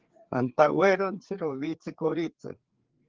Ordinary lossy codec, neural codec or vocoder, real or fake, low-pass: Opus, 16 kbps; codec, 16 kHz, 4 kbps, FreqCodec, larger model; fake; 7.2 kHz